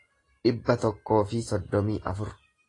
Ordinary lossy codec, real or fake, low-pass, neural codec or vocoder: AAC, 32 kbps; real; 10.8 kHz; none